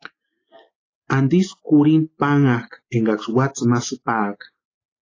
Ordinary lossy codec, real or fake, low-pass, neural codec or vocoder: AAC, 32 kbps; real; 7.2 kHz; none